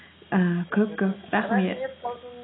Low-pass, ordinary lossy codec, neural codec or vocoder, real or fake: 7.2 kHz; AAC, 16 kbps; none; real